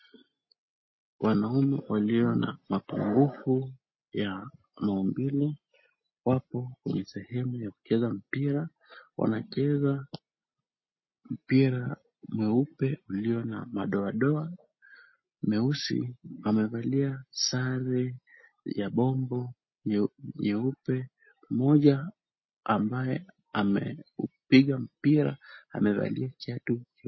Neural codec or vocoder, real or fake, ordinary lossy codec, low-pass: none; real; MP3, 24 kbps; 7.2 kHz